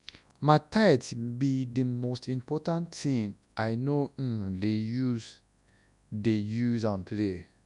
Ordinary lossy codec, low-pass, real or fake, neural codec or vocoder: none; 10.8 kHz; fake; codec, 24 kHz, 0.9 kbps, WavTokenizer, large speech release